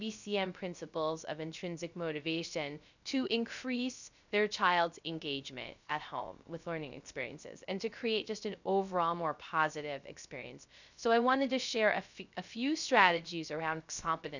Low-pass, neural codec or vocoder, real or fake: 7.2 kHz; codec, 16 kHz, 0.3 kbps, FocalCodec; fake